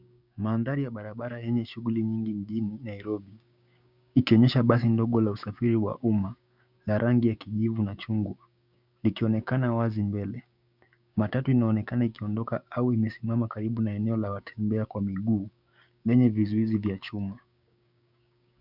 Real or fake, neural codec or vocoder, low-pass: fake; codec, 16 kHz, 6 kbps, DAC; 5.4 kHz